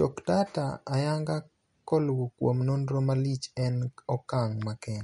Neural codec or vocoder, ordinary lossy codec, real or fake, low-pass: none; MP3, 48 kbps; real; 19.8 kHz